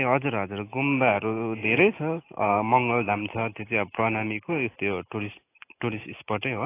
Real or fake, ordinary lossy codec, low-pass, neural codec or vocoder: real; AAC, 24 kbps; 3.6 kHz; none